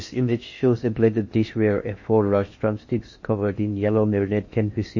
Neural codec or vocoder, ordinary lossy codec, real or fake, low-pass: codec, 16 kHz in and 24 kHz out, 0.6 kbps, FocalCodec, streaming, 4096 codes; MP3, 32 kbps; fake; 7.2 kHz